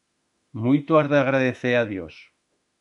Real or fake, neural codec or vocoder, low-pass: fake; autoencoder, 48 kHz, 32 numbers a frame, DAC-VAE, trained on Japanese speech; 10.8 kHz